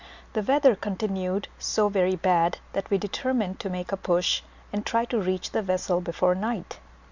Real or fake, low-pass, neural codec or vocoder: real; 7.2 kHz; none